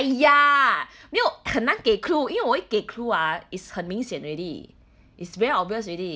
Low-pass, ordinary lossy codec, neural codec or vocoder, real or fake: none; none; none; real